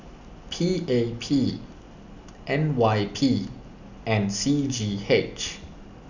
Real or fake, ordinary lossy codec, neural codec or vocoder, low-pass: real; none; none; 7.2 kHz